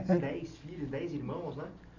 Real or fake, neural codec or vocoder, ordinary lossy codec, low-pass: real; none; none; 7.2 kHz